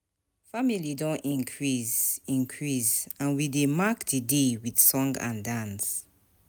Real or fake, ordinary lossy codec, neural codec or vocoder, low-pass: real; none; none; none